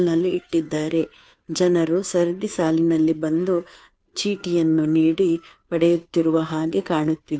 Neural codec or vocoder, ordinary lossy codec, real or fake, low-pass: codec, 16 kHz, 2 kbps, FunCodec, trained on Chinese and English, 25 frames a second; none; fake; none